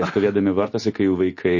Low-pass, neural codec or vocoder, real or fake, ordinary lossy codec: 7.2 kHz; none; real; MP3, 32 kbps